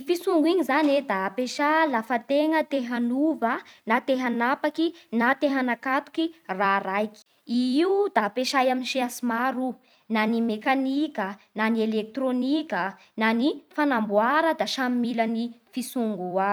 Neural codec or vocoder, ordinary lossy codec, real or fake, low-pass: vocoder, 44.1 kHz, 128 mel bands every 256 samples, BigVGAN v2; none; fake; none